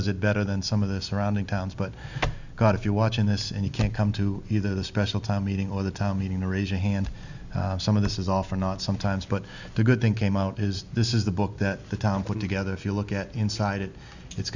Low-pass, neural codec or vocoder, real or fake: 7.2 kHz; none; real